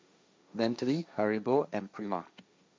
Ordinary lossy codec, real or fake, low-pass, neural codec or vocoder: none; fake; none; codec, 16 kHz, 1.1 kbps, Voila-Tokenizer